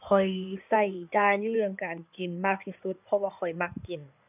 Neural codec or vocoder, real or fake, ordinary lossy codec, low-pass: codec, 16 kHz in and 24 kHz out, 2.2 kbps, FireRedTTS-2 codec; fake; none; 3.6 kHz